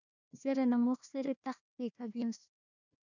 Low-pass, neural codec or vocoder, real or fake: 7.2 kHz; codec, 16 kHz, 1 kbps, FunCodec, trained on Chinese and English, 50 frames a second; fake